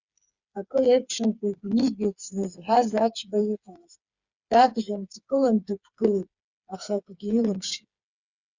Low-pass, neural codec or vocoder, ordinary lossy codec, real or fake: 7.2 kHz; codec, 16 kHz, 4 kbps, FreqCodec, smaller model; Opus, 64 kbps; fake